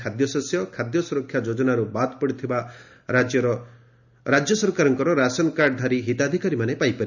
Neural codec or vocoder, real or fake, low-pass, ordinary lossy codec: none; real; 7.2 kHz; none